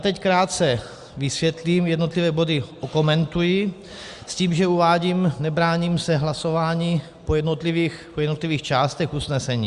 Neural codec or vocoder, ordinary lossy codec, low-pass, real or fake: none; Opus, 64 kbps; 10.8 kHz; real